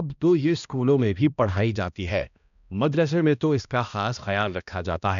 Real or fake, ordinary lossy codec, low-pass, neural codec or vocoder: fake; none; 7.2 kHz; codec, 16 kHz, 1 kbps, X-Codec, HuBERT features, trained on balanced general audio